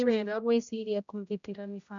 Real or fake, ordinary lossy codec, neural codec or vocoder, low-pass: fake; none; codec, 16 kHz, 0.5 kbps, X-Codec, HuBERT features, trained on general audio; 7.2 kHz